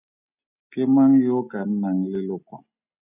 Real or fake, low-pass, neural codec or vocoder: real; 3.6 kHz; none